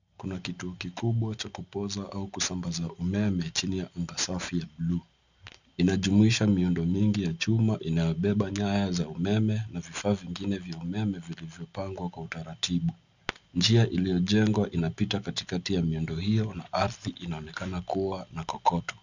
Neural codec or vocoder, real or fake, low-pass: none; real; 7.2 kHz